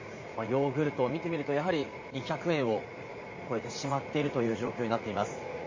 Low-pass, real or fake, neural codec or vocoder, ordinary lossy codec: 7.2 kHz; fake; vocoder, 44.1 kHz, 80 mel bands, Vocos; MP3, 32 kbps